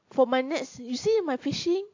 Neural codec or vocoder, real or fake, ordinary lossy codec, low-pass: none; real; AAC, 48 kbps; 7.2 kHz